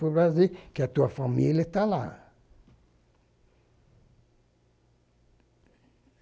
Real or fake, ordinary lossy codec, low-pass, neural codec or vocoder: real; none; none; none